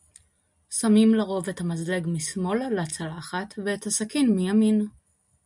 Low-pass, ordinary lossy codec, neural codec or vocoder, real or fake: 10.8 kHz; MP3, 96 kbps; none; real